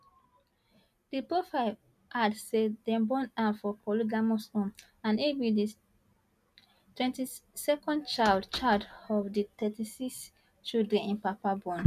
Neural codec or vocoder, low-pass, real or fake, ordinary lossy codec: none; 14.4 kHz; real; none